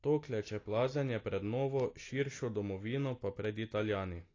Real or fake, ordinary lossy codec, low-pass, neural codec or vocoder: real; AAC, 32 kbps; 7.2 kHz; none